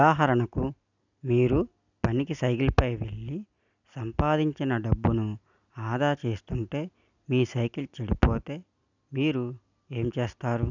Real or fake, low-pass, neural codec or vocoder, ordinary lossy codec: real; 7.2 kHz; none; none